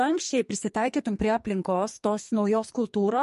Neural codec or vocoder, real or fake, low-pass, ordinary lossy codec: codec, 44.1 kHz, 2.6 kbps, SNAC; fake; 14.4 kHz; MP3, 48 kbps